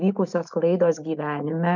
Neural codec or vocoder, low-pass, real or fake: none; 7.2 kHz; real